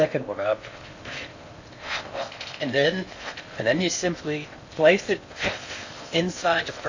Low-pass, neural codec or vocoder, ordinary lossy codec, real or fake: 7.2 kHz; codec, 16 kHz in and 24 kHz out, 0.6 kbps, FocalCodec, streaming, 4096 codes; AAC, 48 kbps; fake